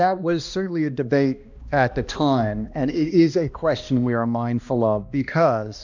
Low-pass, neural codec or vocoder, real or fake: 7.2 kHz; codec, 16 kHz, 1 kbps, X-Codec, HuBERT features, trained on balanced general audio; fake